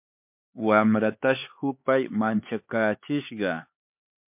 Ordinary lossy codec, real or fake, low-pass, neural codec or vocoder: MP3, 32 kbps; fake; 3.6 kHz; codec, 16 kHz, 4 kbps, X-Codec, HuBERT features, trained on LibriSpeech